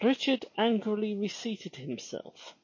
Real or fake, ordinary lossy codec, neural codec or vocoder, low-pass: real; MP3, 32 kbps; none; 7.2 kHz